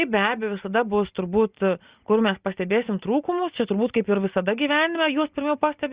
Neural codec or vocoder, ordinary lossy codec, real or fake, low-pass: none; Opus, 64 kbps; real; 3.6 kHz